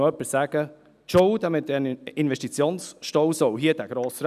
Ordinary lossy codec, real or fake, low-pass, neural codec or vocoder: none; real; 14.4 kHz; none